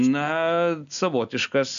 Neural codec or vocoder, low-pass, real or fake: none; 7.2 kHz; real